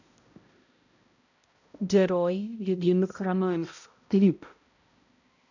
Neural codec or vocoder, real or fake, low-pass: codec, 16 kHz, 0.5 kbps, X-Codec, HuBERT features, trained on balanced general audio; fake; 7.2 kHz